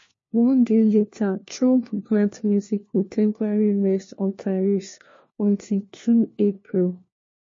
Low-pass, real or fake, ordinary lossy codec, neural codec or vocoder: 7.2 kHz; fake; MP3, 32 kbps; codec, 16 kHz, 1 kbps, FunCodec, trained on LibriTTS, 50 frames a second